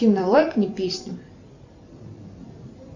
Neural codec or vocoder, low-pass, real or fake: vocoder, 44.1 kHz, 128 mel bands every 256 samples, BigVGAN v2; 7.2 kHz; fake